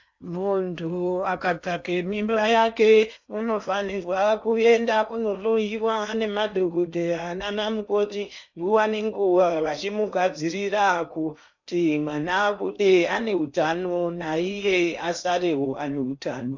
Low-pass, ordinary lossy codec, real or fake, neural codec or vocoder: 7.2 kHz; MP3, 64 kbps; fake; codec, 16 kHz in and 24 kHz out, 0.8 kbps, FocalCodec, streaming, 65536 codes